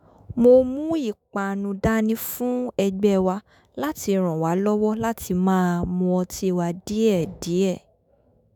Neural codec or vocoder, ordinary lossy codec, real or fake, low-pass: autoencoder, 48 kHz, 128 numbers a frame, DAC-VAE, trained on Japanese speech; none; fake; 19.8 kHz